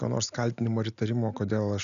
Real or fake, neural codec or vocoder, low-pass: real; none; 7.2 kHz